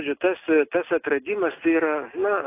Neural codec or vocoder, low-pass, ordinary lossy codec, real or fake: none; 3.6 kHz; AAC, 24 kbps; real